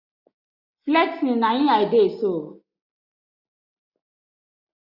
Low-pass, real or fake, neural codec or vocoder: 5.4 kHz; real; none